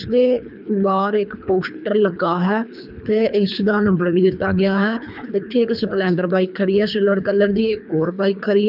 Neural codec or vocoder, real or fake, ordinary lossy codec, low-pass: codec, 24 kHz, 3 kbps, HILCodec; fake; none; 5.4 kHz